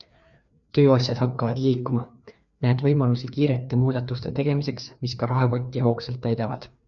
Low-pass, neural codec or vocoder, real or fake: 7.2 kHz; codec, 16 kHz, 2 kbps, FreqCodec, larger model; fake